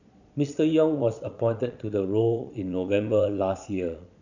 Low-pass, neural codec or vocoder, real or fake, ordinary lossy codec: 7.2 kHz; vocoder, 22.05 kHz, 80 mel bands, Vocos; fake; none